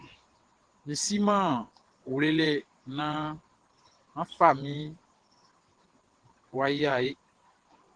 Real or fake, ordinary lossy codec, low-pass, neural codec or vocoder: fake; Opus, 16 kbps; 9.9 kHz; vocoder, 22.05 kHz, 80 mel bands, WaveNeXt